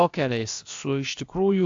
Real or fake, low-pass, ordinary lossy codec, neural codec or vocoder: fake; 7.2 kHz; AAC, 48 kbps; codec, 16 kHz, about 1 kbps, DyCAST, with the encoder's durations